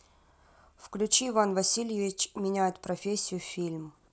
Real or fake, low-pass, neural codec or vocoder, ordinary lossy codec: real; none; none; none